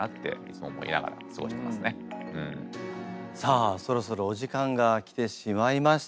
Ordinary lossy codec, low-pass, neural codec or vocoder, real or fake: none; none; none; real